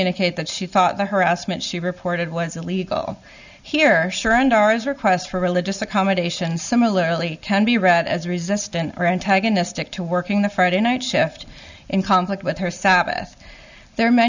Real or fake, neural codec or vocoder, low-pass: real; none; 7.2 kHz